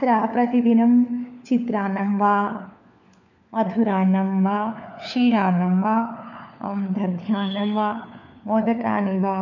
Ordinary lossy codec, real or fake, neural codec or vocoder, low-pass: none; fake; codec, 16 kHz, 4 kbps, FunCodec, trained on LibriTTS, 50 frames a second; 7.2 kHz